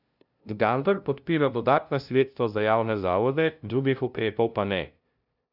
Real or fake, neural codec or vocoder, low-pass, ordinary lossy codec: fake; codec, 16 kHz, 0.5 kbps, FunCodec, trained on LibriTTS, 25 frames a second; 5.4 kHz; none